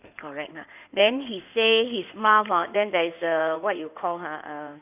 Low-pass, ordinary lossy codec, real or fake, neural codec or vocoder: 3.6 kHz; none; fake; codec, 16 kHz in and 24 kHz out, 2.2 kbps, FireRedTTS-2 codec